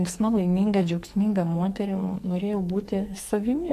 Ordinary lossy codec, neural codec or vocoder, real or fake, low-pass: AAC, 64 kbps; codec, 44.1 kHz, 2.6 kbps, SNAC; fake; 14.4 kHz